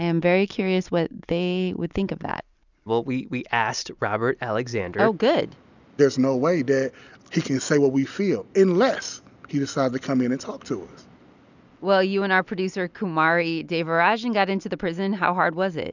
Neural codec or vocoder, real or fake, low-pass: none; real; 7.2 kHz